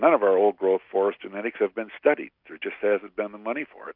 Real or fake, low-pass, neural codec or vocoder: real; 5.4 kHz; none